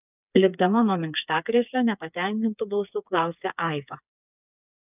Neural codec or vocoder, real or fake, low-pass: codec, 16 kHz, 4 kbps, FreqCodec, smaller model; fake; 3.6 kHz